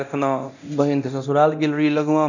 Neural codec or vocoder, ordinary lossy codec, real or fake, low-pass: codec, 24 kHz, 0.9 kbps, DualCodec; none; fake; 7.2 kHz